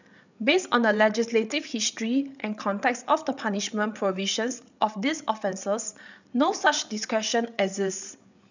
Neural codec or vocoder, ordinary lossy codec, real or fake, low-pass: codec, 16 kHz, 8 kbps, FreqCodec, larger model; none; fake; 7.2 kHz